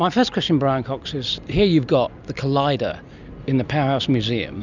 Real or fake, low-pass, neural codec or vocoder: real; 7.2 kHz; none